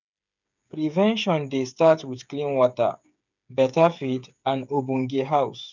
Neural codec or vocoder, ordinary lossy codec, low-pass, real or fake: codec, 16 kHz, 8 kbps, FreqCodec, smaller model; none; 7.2 kHz; fake